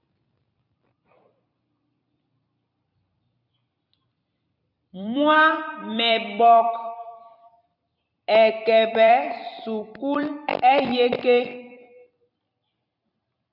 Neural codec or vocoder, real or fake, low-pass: vocoder, 44.1 kHz, 128 mel bands every 256 samples, BigVGAN v2; fake; 5.4 kHz